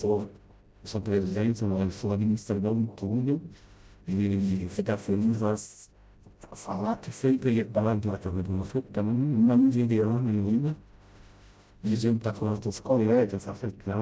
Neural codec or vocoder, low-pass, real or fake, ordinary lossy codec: codec, 16 kHz, 0.5 kbps, FreqCodec, smaller model; none; fake; none